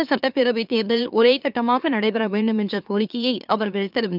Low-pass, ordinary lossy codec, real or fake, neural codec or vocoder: 5.4 kHz; none; fake; autoencoder, 44.1 kHz, a latent of 192 numbers a frame, MeloTTS